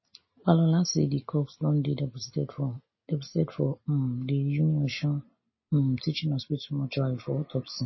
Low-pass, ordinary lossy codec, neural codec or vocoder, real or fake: 7.2 kHz; MP3, 24 kbps; none; real